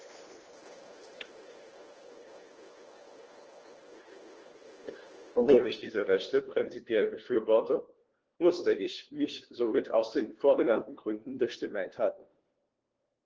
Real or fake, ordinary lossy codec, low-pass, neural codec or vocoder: fake; Opus, 16 kbps; 7.2 kHz; codec, 16 kHz, 1 kbps, FunCodec, trained on LibriTTS, 50 frames a second